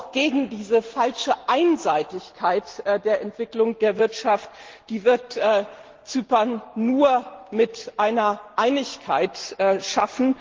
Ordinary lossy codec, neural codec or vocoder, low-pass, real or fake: Opus, 16 kbps; none; 7.2 kHz; real